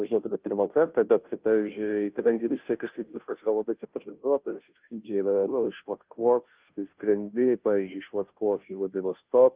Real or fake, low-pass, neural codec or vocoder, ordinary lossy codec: fake; 3.6 kHz; codec, 16 kHz, 0.5 kbps, FunCodec, trained on Chinese and English, 25 frames a second; Opus, 32 kbps